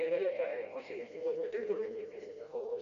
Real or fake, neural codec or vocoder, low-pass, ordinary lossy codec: fake; codec, 16 kHz, 1 kbps, FreqCodec, smaller model; 7.2 kHz; Opus, 64 kbps